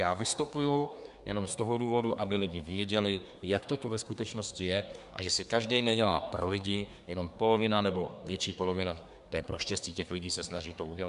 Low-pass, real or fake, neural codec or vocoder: 10.8 kHz; fake; codec, 24 kHz, 1 kbps, SNAC